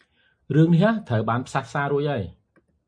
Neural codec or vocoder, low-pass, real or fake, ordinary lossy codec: none; 9.9 kHz; real; MP3, 48 kbps